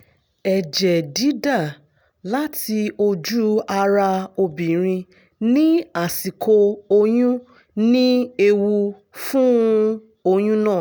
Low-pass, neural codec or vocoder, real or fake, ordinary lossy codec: none; none; real; none